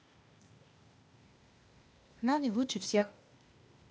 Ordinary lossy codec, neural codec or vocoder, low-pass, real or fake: none; codec, 16 kHz, 0.8 kbps, ZipCodec; none; fake